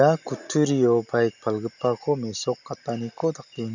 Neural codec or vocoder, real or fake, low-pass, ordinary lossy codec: none; real; 7.2 kHz; none